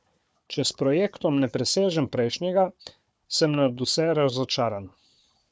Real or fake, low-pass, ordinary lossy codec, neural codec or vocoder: fake; none; none; codec, 16 kHz, 4 kbps, FunCodec, trained on Chinese and English, 50 frames a second